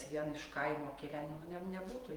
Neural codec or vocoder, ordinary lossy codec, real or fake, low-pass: vocoder, 48 kHz, 128 mel bands, Vocos; Opus, 24 kbps; fake; 19.8 kHz